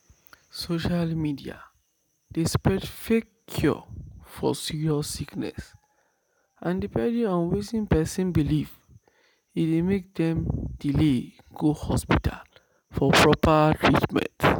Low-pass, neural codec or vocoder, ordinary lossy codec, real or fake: none; none; none; real